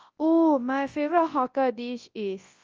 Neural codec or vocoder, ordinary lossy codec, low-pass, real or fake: codec, 24 kHz, 0.9 kbps, WavTokenizer, large speech release; Opus, 24 kbps; 7.2 kHz; fake